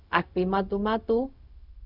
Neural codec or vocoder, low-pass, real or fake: codec, 16 kHz, 0.4 kbps, LongCat-Audio-Codec; 5.4 kHz; fake